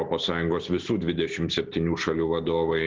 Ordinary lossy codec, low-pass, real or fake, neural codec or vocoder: Opus, 16 kbps; 7.2 kHz; real; none